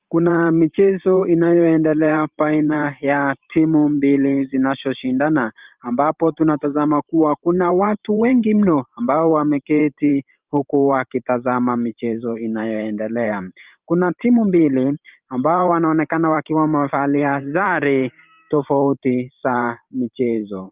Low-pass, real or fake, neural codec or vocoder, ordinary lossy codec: 3.6 kHz; fake; vocoder, 44.1 kHz, 128 mel bands every 512 samples, BigVGAN v2; Opus, 32 kbps